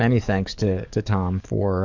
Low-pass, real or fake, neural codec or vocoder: 7.2 kHz; fake; codec, 44.1 kHz, 7.8 kbps, DAC